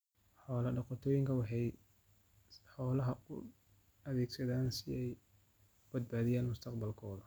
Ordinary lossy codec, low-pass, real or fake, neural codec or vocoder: none; none; real; none